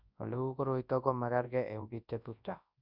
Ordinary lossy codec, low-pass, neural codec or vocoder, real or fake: Opus, 64 kbps; 5.4 kHz; codec, 24 kHz, 0.9 kbps, WavTokenizer, large speech release; fake